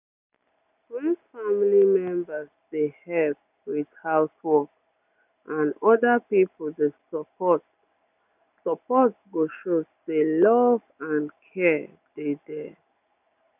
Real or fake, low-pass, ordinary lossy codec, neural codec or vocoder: real; 3.6 kHz; none; none